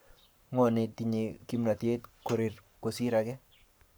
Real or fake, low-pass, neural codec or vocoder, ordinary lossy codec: fake; none; codec, 44.1 kHz, 7.8 kbps, Pupu-Codec; none